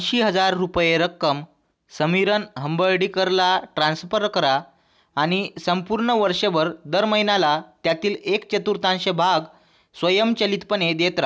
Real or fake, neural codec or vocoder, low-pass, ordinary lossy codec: real; none; none; none